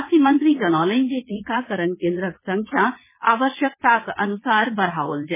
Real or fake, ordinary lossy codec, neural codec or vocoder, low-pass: fake; MP3, 16 kbps; vocoder, 22.05 kHz, 80 mel bands, Vocos; 3.6 kHz